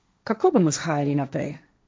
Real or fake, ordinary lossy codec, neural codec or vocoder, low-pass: fake; none; codec, 16 kHz, 1.1 kbps, Voila-Tokenizer; none